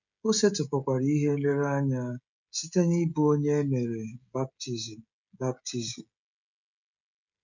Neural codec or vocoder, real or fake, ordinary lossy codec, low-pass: codec, 16 kHz, 16 kbps, FreqCodec, smaller model; fake; none; 7.2 kHz